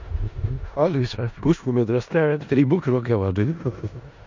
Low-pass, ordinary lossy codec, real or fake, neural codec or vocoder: 7.2 kHz; MP3, 64 kbps; fake; codec, 16 kHz in and 24 kHz out, 0.4 kbps, LongCat-Audio-Codec, four codebook decoder